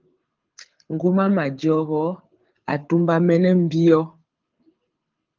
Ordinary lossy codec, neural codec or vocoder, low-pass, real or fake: Opus, 24 kbps; codec, 24 kHz, 6 kbps, HILCodec; 7.2 kHz; fake